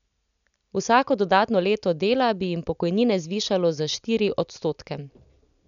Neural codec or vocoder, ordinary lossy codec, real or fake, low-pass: none; none; real; 7.2 kHz